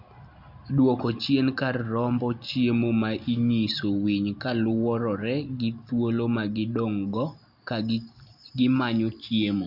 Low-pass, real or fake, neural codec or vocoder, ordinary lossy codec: 5.4 kHz; real; none; none